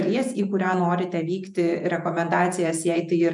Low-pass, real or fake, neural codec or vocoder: 10.8 kHz; fake; vocoder, 48 kHz, 128 mel bands, Vocos